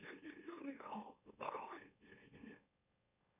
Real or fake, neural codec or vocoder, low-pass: fake; autoencoder, 44.1 kHz, a latent of 192 numbers a frame, MeloTTS; 3.6 kHz